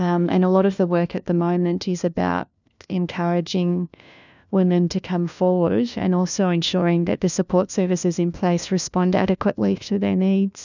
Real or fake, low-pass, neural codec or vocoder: fake; 7.2 kHz; codec, 16 kHz, 1 kbps, FunCodec, trained on LibriTTS, 50 frames a second